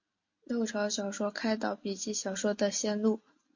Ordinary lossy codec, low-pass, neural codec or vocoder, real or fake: MP3, 48 kbps; 7.2 kHz; none; real